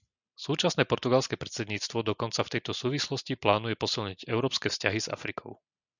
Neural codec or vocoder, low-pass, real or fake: none; 7.2 kHz; real